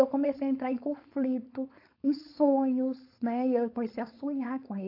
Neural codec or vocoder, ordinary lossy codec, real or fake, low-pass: codec, 16 kHz, 4.8 kbps, FACodec; AAC, 32 kbps; fake; 5.4 kHz